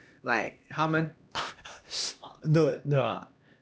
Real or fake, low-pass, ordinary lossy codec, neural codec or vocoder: fake; none; none; codec, 16 kHz, 1 kbps, X-Codec, HuBERT features, trained on LibriSpeech